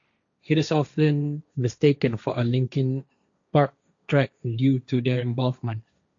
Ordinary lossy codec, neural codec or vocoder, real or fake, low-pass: AAC, 64 kbps; codec, 16 kHz, 1.1 kbps, Voila-Tokenizer; fake; 7.2 kHz